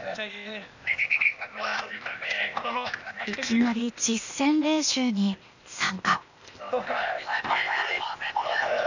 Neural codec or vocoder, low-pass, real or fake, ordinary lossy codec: codec, 16 kHz, 0.8 kbps, ZipCodec; 7.2 kHz; fake; none